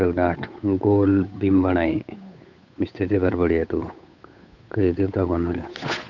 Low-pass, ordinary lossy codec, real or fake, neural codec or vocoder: 7.2 kHz; none; fake; codec, 16 kHz, 8 kbps, FunCodec, trained on Chinese and English, 25 frames a second